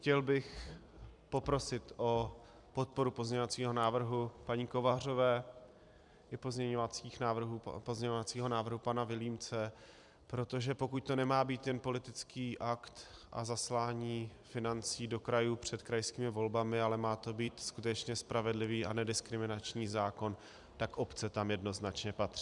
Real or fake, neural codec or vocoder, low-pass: fake; vocoder, 44.1 kHz, 128 mel bands every 256 samples, BigVGAN v2; 10.8 kHz